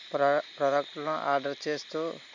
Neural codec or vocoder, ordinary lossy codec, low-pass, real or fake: none; MP3, 64 kbps; 7.2 kHz; real